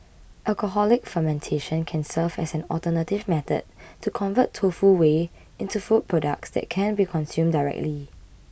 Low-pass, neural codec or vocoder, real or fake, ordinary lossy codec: none; none; real; none